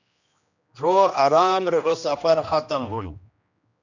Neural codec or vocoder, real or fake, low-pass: codec, 16 kHz, 1 kbps, X-Codec, HuBERT features, trained on general audio; fake; 7.2 kHz